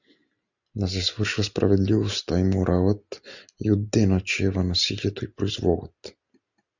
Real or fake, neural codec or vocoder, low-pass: real; none; 7.2 kHz